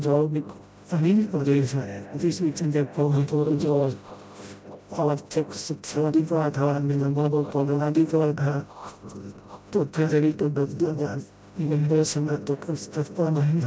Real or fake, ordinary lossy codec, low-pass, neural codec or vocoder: fake; none; none; codec, 16 kHz, 0.5 kbps, FreqCodec, smaller model